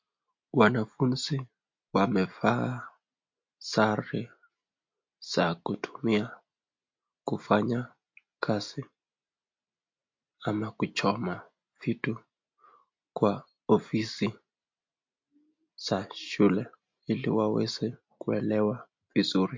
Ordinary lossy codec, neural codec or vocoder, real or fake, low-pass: MP3, 48 kbps; none; real; 7.2 kHz